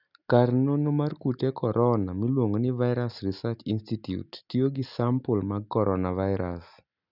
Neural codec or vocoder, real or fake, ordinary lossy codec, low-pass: none; real; none; 5.4 kHz